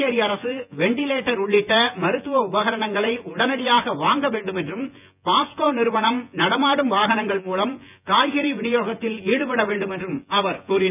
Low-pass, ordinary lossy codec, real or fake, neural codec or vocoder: 3.6 kHz; none; fake; vocoder, 24 kHz, 100 mel bands, Vocos